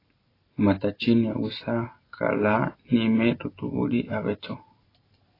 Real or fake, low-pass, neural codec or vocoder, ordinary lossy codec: real; 5.4 kHz; none; AAC, 24 kbps